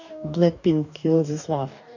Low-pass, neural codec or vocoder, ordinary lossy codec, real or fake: 7.2 kHz; codec, 44.1 kHz, 2.6 kbps, DAC; none; fake